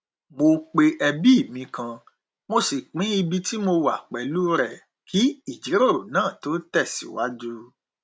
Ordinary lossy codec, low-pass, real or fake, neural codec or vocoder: none; none; real; none